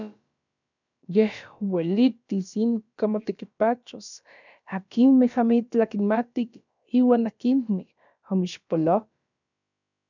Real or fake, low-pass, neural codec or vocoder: fake; 7.2 kHz; codec, 16 kHz, about 1 kbps, DyCAST, with the encoder's durations